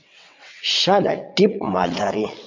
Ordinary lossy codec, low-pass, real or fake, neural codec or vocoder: MP3, 64 kbps; 7.2 kHz; fake; vocoder, 22.05 kHz, 80 mel bands, WaveNeXt